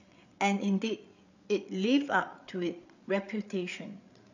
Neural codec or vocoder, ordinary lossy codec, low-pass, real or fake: codec, 16 kHz, 8 kbps, FreqCodec, larger model; none; 7.2 kHz; fake